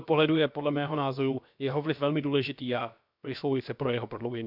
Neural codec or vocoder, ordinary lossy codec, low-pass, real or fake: codec, 16 kHz, about 1 kbps, DyCAST, with the encoder's durations; none; 5.4 kHz; fake